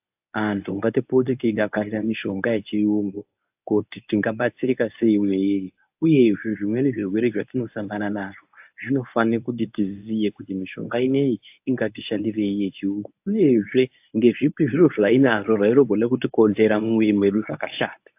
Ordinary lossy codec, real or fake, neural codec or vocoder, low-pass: AAC, 32 kbps; fake; codec, 24 kHz, 0.9 kbps, WavTokenizer, medium speech release version 1; 3.6 kHz